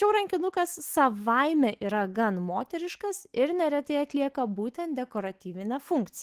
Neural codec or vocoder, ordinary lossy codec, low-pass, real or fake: autoencoder, 48 kHz, 128 numbers a frame, DAC-VAE, trained on Japanese speech; Opus, 16 kbps; 14.4 kHz; fake